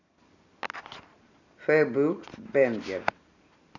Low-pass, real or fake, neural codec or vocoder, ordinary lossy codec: 7.2 kHz; fake; vocoder, 44.1 kHz, 128 mel bands every 256 samples, BigVGAN v2; none